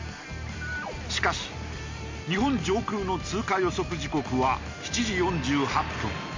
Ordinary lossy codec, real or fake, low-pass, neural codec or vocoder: MP3, 48 kbps; real; 7.2 kHz; none